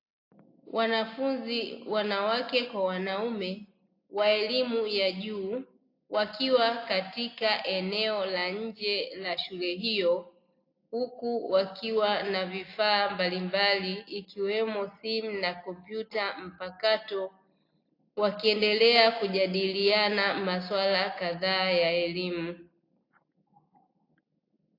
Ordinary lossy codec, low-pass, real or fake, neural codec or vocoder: AAC, 32 kbps; 5.4 kHz; real; none